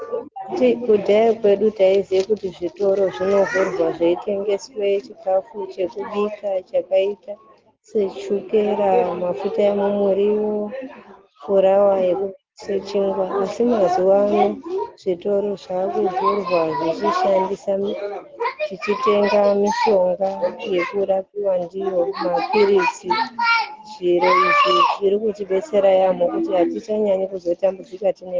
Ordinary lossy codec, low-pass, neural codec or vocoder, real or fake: Opus, 16 kbps; 7.2 kHz; none; real